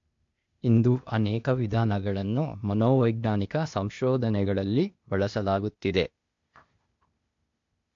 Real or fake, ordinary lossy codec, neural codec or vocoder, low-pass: fake; MP3, 48 kbps; codec, 16 kHz, 0.8 kbps, ZipCodec; 7.2 kHz